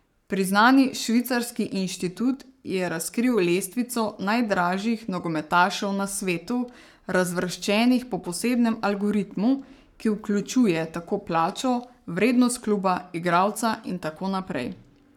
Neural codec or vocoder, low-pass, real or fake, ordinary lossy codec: codec, 44.1 kHz, 7.8 kbps, Pupu-Codec; 19.8 kHz; fake; none